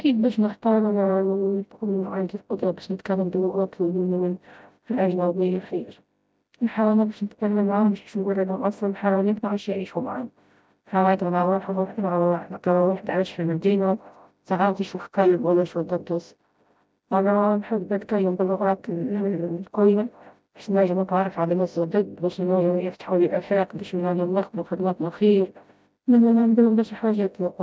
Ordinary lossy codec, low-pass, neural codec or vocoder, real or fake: none; none; codec, 16 kHz, 0.5 kbps, FreqCodec, smaller model; fake